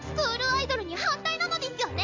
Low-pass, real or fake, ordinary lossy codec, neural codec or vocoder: 7.2 kHz; real; MP3, 64 kbps; none